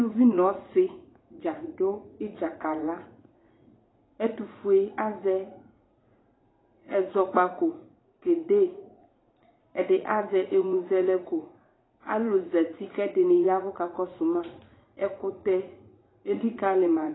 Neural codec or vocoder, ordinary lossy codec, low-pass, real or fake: vocoder, 44.1 kHz, 80 mel bands, Vocos; AAC, 16 kbps; 7.2 kHz; fake